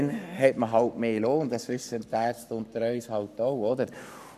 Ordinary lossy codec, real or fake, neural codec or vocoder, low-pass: none; fake; codec, 44.1 kHz, 7.8 kbps, Pupu-Codec; 14.4 kHz